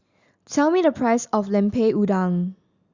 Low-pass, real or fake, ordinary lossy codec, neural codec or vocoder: 7.2 kHz; real; Opus, 64 kbps; none